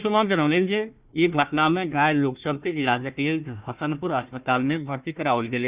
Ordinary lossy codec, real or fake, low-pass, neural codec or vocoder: Opus, 64 kbps; fake; 3.6 kHz; codec, 16 kHz, 1 kbps, FunCodec, trained on Chinese and English, 50 frames a second